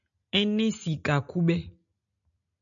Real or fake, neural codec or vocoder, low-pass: real; none; 7.2 kHz